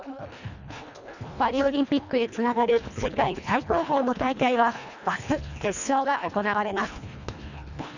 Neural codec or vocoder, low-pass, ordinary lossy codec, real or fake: codec, 24 kHz, 1.5 kbps, HILCodec; 7.2 kHz; none; fake